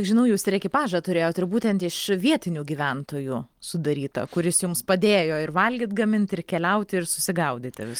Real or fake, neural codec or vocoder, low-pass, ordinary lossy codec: real; none; 19.8 kHz; Opus, 24 kbps